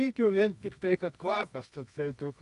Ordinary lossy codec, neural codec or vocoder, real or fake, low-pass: AAC, 96 kbps; codec, 24 kHz, 0.9 kbps, WavTokenizer, medium music audio release; fake; 10.8 kHz